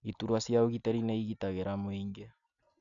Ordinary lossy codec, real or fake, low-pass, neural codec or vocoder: none; real; 7.2 kHz; none